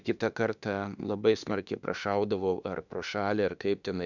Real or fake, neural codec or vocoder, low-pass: fake; autoencoder, 48 kHz, 32 numbers a frame, DAC-VAE, trained on Japanese speech; 7.2 kHz